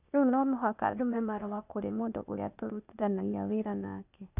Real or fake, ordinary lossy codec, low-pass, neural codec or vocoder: fake; none; 3.6 kHz; codec, 16 kHz, 0.8 kbps, ZipCodec